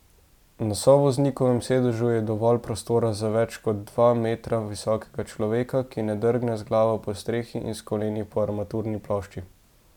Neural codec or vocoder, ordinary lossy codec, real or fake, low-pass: none; none; real; 19.8 kHz